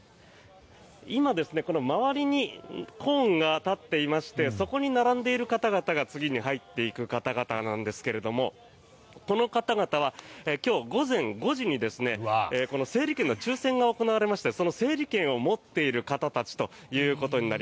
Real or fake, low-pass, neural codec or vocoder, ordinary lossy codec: real; none; none; none